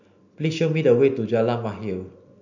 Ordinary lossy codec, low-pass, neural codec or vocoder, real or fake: none; 7.2 kHz; none; real